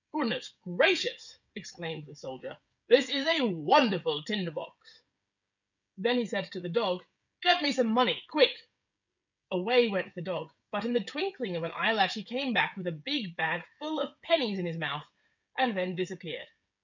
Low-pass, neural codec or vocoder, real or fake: 7.2 kHz; codec, 16 kHz, 16 kbps, FreqCodec, smaller model; fake